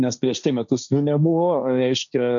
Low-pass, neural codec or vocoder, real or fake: 7.2 kHz; codec, 16 kHz, 1.1 kbps, Voila-Tokenizer; fake